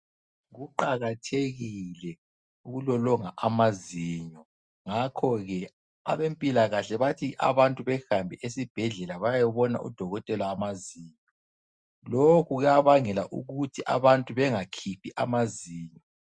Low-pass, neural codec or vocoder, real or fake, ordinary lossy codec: 9.9 kHz; none; real; Opus, 64 kbps